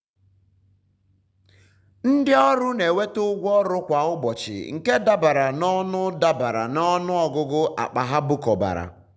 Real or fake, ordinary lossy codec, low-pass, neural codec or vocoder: real; none; none; none